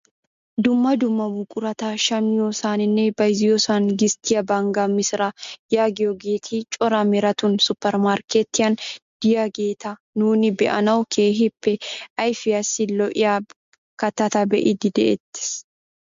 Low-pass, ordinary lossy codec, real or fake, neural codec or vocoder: 7.2 kHz; MP3, 48 kbps; real; none